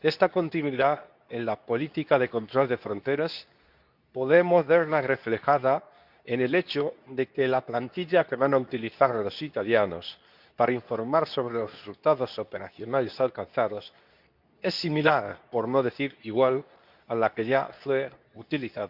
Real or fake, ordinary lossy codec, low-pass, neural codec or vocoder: fake; none; 5.4 kHz; codec, 24 kHz, 0.9 kbps, WavTokenizer, medium speech release version 2